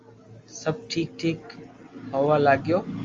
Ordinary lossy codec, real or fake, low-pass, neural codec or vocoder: Opus, 32 kbps; real; 7.2 kHz; none